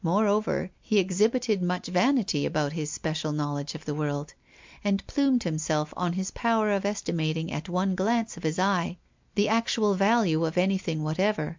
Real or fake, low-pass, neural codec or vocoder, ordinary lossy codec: real; 7.2 kHz; none; MP3, 64 kbps